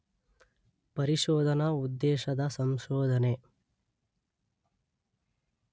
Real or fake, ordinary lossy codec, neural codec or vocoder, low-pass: real; none; none; none